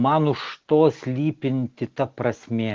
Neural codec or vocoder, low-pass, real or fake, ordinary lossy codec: none; 7.2 kHz; real; Opus, 16 kbps